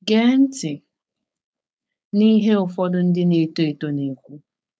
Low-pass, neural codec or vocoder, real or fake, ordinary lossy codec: none; codec, 16 kHz, 4.8 kbps, FACodec; fake; none